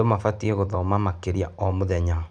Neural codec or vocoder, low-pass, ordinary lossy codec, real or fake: none; 9.9 kHz; none; real